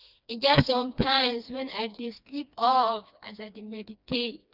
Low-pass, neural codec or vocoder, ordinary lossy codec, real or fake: 5.4 kHz; codec, 16 kHz, 2 kbps, FreqCodec, smaller model; none; fake